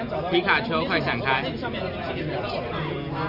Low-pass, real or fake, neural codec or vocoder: 5.4 kHz; real; none